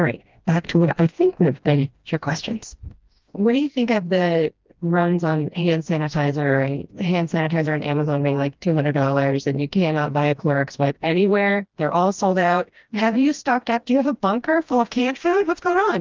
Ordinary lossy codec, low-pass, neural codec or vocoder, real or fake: Opus, 24 kbps; 7.2 kHz; codec, 16 kHz, 1 kbps, FreqCodec, smaller model; fake